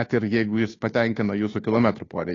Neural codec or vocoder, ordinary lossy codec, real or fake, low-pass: codec, 16 kHz, 2 kbps, FunCodec, trained on Chinese and English, 25 frames a second; AAC, 32 kbps; fake; 7.2 kHz